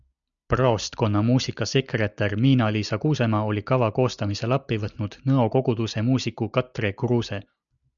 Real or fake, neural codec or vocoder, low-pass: real; none; 7.2 kHz